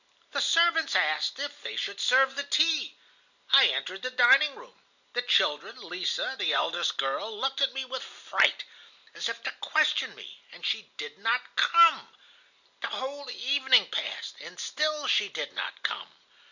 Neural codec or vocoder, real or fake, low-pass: none; real; 7.2 kHz